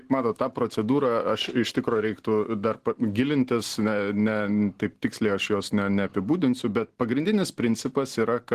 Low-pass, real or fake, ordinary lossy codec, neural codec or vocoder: 14.4 kHz; real; Opus, 16 kbps; none